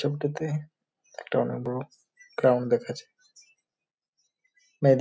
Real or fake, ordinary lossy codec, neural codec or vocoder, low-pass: real; none; none; none